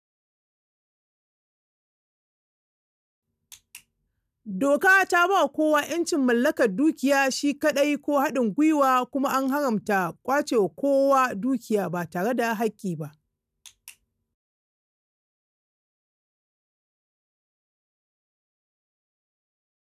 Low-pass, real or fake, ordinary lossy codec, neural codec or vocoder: 14.4 kHz; real; none; none